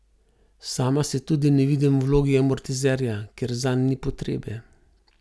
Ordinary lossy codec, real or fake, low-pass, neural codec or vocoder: none; real; none; none